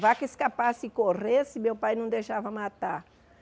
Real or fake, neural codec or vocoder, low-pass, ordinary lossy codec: real; none; none; none